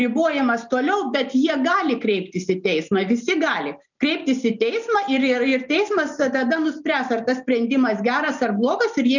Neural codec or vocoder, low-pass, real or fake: none; 7.2 kHz; real